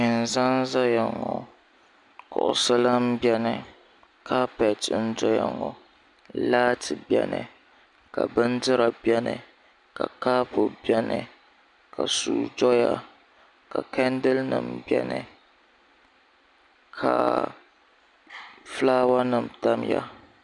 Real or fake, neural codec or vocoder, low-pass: real; none; 10.8 kHz